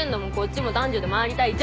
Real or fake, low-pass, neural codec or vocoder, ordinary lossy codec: real; none; none; none